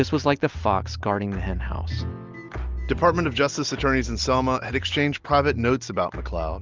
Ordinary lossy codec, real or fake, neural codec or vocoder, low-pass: Opus, 24 kbps; real; none; 7.2 kHz